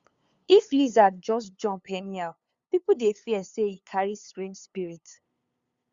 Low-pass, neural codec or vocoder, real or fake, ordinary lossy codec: 7.2 kHz; codec, 16 kHz, 2 kbps, FunCodec, trained on LibriTTS, 25 frames a second; fake; Opus, 64 kbps